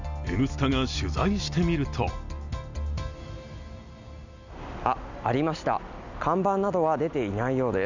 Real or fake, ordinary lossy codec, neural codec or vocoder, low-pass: real; none; none; 7.2 kHz